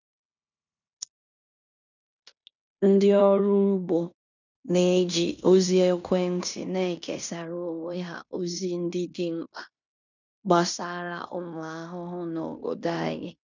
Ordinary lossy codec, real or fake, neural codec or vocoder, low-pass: none; fake; codec, 16 kHz in and 24 kHz out, 0.9 kbps, LongCat-Audio-Codec, fine tuned four codebook decoder; 7.2 kHz